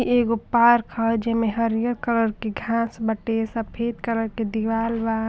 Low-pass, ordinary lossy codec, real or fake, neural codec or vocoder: none; none; real; none